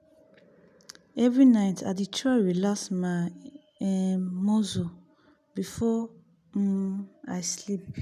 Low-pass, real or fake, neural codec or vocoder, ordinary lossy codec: 14.4 kHz; real; none; none